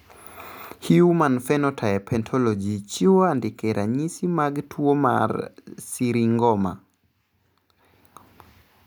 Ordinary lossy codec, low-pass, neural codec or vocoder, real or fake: none; none; none; real